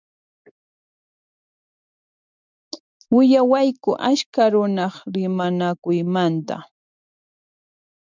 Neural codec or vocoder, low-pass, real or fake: none; 7.2 kHz; real